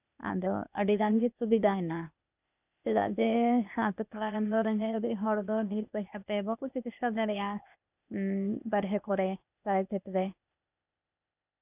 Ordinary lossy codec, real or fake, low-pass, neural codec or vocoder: none; fake; 3.6 kHz; codec, 16 kHz, 0.8 kbps, ZipCodec